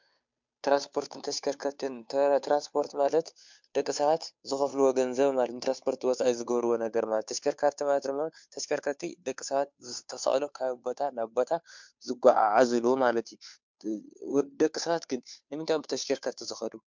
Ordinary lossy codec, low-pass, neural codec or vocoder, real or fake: MP3, 64 kbps; 7.2 kHz; codec, 16 kHz, 2 kbps, FunCodec, trained on Chinese and English, 25 frames a second; fake